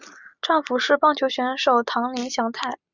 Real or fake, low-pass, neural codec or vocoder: real; 7.2 kHz; none